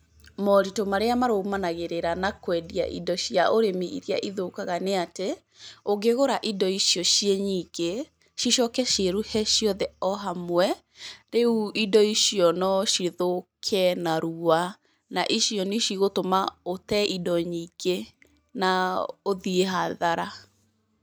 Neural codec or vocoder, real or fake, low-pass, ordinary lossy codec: none; real; none; none